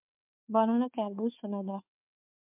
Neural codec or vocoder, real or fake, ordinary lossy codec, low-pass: codec, 16 kHz, 4 kbps, FunCodec, trained on Chinese and English, 50 frames a second; fake; MP3, 32 kbps; 3.6 kHz